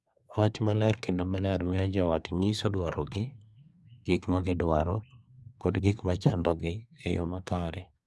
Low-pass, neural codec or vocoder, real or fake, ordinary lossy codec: none; codec, 24 kHz, 1 kbps, SNAC; fake; none